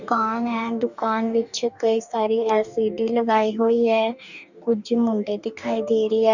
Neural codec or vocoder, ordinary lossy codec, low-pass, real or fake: codec, 44.1 kHz, 2.6 kbps, DAC; none; 7.2 kHz; fake